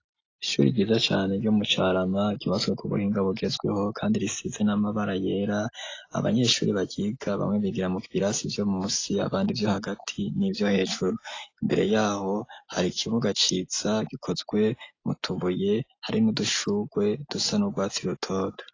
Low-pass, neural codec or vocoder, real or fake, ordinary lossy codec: 7.2 kHz; none; real; AAC, 32 kbps